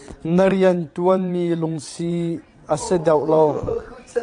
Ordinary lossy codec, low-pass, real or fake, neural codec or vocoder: AAC, 64 kbps; 9.9 kHz; fake; vocoder, 22.05 kHz, 80 mel bands, WaveNeXt